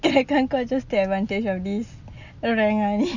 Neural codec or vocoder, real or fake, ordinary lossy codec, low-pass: none; real; none; 7.2 kHz